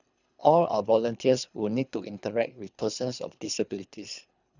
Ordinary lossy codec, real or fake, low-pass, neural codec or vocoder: none; fake; 7.2 kHz; codec, 24 kHz, 3 kbps, HILCodec